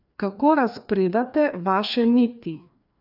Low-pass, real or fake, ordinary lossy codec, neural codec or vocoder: 5.4 kHz; fake; none; codec, 16 kHz, 2 kbps, FreqCodec, larger model